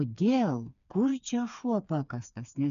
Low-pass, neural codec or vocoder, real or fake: 7.2 kHz; codec, 16 kHz, 4 kbps, FreqCodec, smaller model; fake